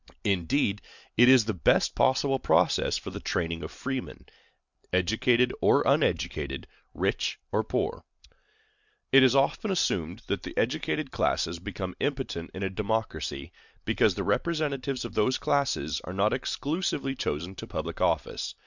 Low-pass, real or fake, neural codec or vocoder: 7.2 kHz; real; none